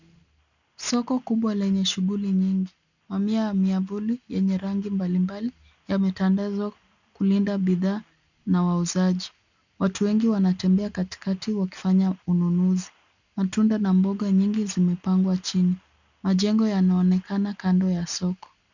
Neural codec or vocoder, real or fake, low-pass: none; real; 7.2 kHz